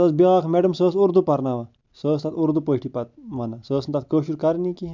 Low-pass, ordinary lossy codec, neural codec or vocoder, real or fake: 7.2 kHz; MP3, 64 kbps; none; real